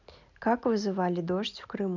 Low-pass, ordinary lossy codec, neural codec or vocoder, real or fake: 7.2 kHz; none; none; real